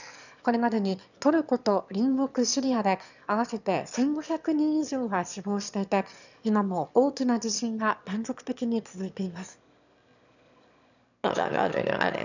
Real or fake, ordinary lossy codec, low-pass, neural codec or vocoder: fake; none; 7.2 kHz; autoencoder, 22.05 kHz, a latent of 192 numbers a frame, VITS, trained on one speaker